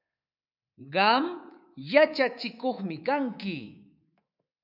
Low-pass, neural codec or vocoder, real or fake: 5.4 kHz; codec, 24 kHz, 3.1 kbps, DualCodec; fake